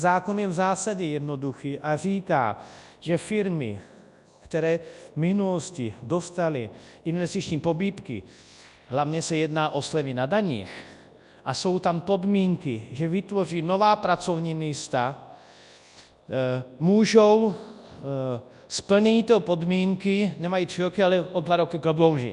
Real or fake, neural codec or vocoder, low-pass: fake; codec, 24 kHz, 0.9 kbps, WavTokenizer, large speech release; 10.8 kHz